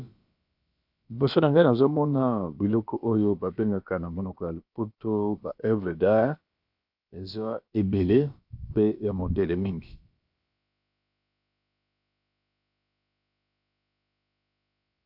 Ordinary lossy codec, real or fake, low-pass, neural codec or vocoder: AAC, 48 kbps; fake; 5.4 kHz; codec, 16 kHz, about 1 kbps, DyCAST, with the encoder's durations